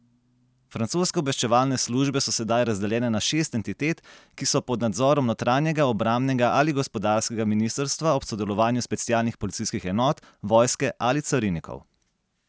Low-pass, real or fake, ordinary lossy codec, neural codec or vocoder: none; real; none; none